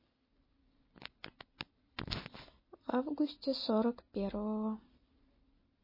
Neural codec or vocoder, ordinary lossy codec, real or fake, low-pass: none; MP3, 24 kbps; real; 5.4 kHz